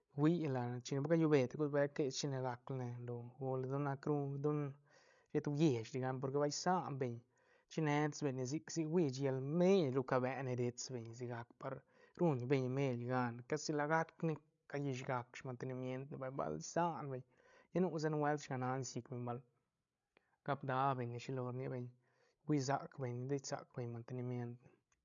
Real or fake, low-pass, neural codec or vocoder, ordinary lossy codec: fake; 7.2 kHz; codec, 16 kHz, 16 kbps, FreqCodec, larger model; none